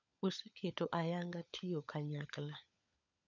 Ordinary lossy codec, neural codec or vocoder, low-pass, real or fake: none; codec, 16 kHz, 16 kbps, FunCodec, trained on LibriTTS, 50 frames a second; 7.2 kHz; fake